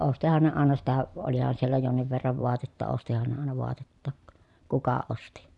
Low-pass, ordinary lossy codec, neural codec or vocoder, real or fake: 10.8 kHz; none; vocoder, 44.1 kHz, 128 mel bands every 512 samples, BigVGAN v2; fake